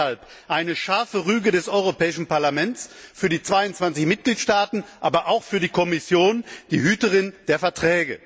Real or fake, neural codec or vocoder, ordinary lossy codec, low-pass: real; none; none; none